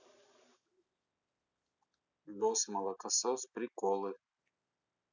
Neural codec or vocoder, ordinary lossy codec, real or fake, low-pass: none; none; real; 7.2 kHz